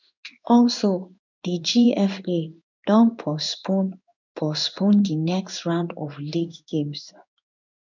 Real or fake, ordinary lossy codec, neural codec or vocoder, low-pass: fake; none; codec, 16 kHz in and 24 kHz out, 1 kbps, XY-Tokenizer; 7.2 kHz